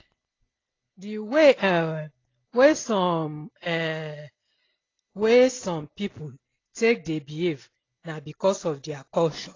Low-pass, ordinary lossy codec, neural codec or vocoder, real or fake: 7.2 kHz; AAC, 32 kbps; none; real